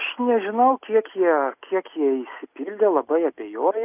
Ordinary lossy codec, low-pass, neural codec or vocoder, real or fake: AAC, 32 kbps; 3.6 kHz; none; real